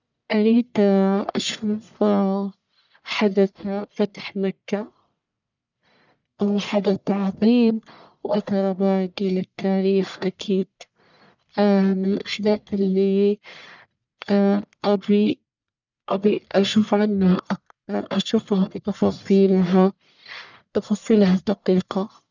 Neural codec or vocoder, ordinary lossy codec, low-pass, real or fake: codec, 44.1 kHz, 1.7 kbps, Pupu-Codec; none; 7.2 kHz; fake